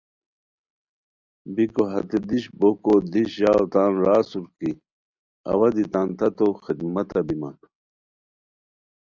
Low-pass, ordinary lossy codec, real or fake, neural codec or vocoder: 7.2 kHz; Opus, 64 kbps; real; none